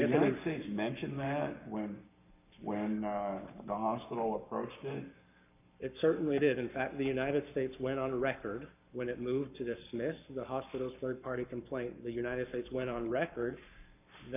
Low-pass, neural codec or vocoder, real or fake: 3.6 kHz; codec, 44.1 kHz, 7.8 kbps, Pupu-Codec; fake